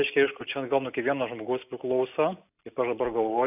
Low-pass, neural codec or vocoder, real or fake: 3.6 kHz; none; real